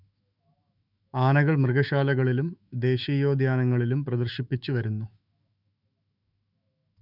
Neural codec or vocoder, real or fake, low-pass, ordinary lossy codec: autoencoder, 48 kHz, 128 numbers a frame, DAC-VAE, trained on Japanese speech; fake; 5.4 kHz; none